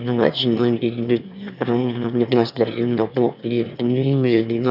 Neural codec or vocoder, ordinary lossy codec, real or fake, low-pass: autoencoder, 22.05 kHz, a latent of 192 numbers a frame, VITS, trained on one speaker; none; fake; 5.4 kHz